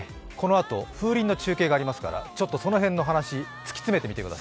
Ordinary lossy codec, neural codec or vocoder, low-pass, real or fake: none; none; none; real